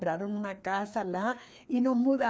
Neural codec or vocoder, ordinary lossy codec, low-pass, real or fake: codec, 16 kHz, 2 kbps, FreqCodec, larger model; none; none; fake